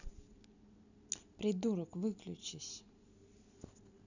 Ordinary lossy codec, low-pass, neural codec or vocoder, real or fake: none; 7.2 kHz; none; real